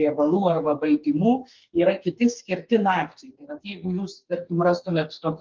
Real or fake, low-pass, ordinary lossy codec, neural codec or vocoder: fake; 7.2 kHz; Opus, 32 kbps; codec, 44.1 kHz, 2.6 kbps, DAC